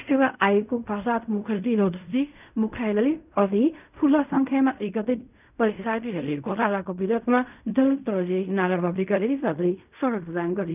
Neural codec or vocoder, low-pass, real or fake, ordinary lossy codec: codec, 16 kHz in and 24 kHz out, 0.4 kbps, LongCat-Audio-Codec, fine tuned four codebook decoder; 3.6 kHz; fake; none